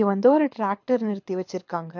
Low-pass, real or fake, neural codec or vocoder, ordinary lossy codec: 7.2 kHz; fake; codec, 24 kHz, 6 kbps, HILCodec; MP3, 48 kbps